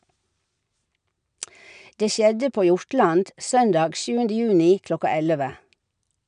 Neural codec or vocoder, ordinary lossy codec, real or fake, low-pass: none; none; real; 9.9 kHz